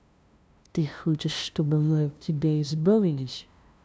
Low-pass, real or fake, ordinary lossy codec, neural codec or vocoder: none; fake; none; codec, 16 kHz, 0.5 kbps, FunCodec, trained on LibriTTS, 25 frames a second